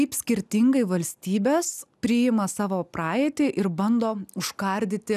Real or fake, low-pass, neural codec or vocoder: real; 14.4 kHz; none